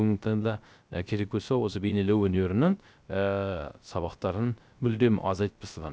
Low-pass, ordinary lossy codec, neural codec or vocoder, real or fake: none; none; codec, 16 kHz, 0.3 kbps, FocalCodec; fake